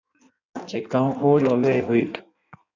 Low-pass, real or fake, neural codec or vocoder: 7.2 kHz; fake; codec, 16 kHz in and 24 kHz out, 1.1 kbps, FireRedTTS-2 codec